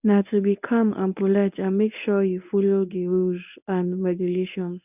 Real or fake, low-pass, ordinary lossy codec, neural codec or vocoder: fake; 3.6 kHz; none; codec, 24 kHz, 0.9 kbps, WavTokenizer, medium speech release version 1